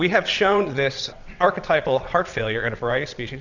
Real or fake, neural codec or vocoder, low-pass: fake; vocoder, 22.05 kHz, 80 mel bands, WaveNeXt; 7.2 kHz